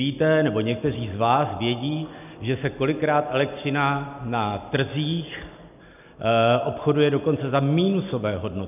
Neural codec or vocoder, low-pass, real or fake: none; 3.6 kHz; real